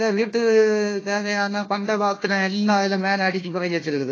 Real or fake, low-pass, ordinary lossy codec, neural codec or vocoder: fake; 7.2 kHz; AAC, 32 kbps; codec, 16 kHz, 1 kbps, FunCodec, trained on Chinese and English, 50 frames a second